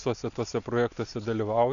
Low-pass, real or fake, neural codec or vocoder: 7.2 kHz; real; none